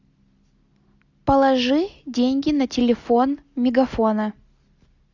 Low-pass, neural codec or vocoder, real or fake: 7.2 kHz; none; real